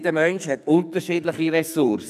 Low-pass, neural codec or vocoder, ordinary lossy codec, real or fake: 14.4 kHz; codec, 44.1 kHz, 2.6 kbps, SNAC; none; fake